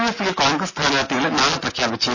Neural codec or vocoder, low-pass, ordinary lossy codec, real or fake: none; 7.2 kHz; none; real